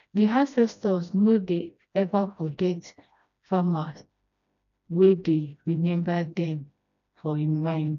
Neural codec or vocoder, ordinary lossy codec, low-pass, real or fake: codec, 16 kHz, 1 kbps, FreqCodec, smaller model; none; 7.2 kHz; fake